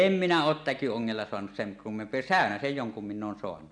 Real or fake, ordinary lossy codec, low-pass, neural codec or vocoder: real; none; 9.9 kHz; none